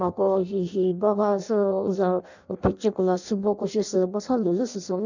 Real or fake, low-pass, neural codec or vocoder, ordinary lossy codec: fake; 7.2 kHz; codec, 16 kHz in and 24 kHz out, 0.6 kbps, FireRedTTS-2 codec; none